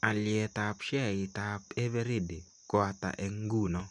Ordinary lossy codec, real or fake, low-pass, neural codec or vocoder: none; real; 10.8 kHz; none